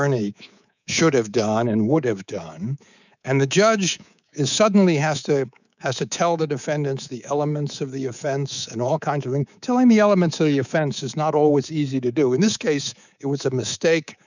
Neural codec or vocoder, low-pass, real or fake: codec, 24 kHz, 3.1 kbps, DualCodec; 7.2 kHz; fake